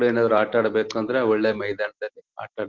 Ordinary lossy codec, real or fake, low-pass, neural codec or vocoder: Opus, 16 kbps; real; 7.2 kHz; none